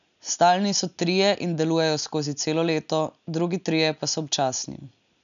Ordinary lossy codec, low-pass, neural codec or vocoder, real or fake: none; 7.2 kHz; none; real